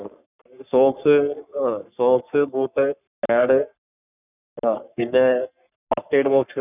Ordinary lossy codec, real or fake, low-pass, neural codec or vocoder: none; fake; 3.6 kHz; codec, 44.1 kHz, 3.4 kbps, Pupu-Codec